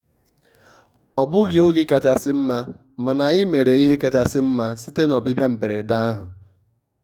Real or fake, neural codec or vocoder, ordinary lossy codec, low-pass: fake; codec, 44.1 kHz, 2.6 kbps, DAC; none; 19.8 kHz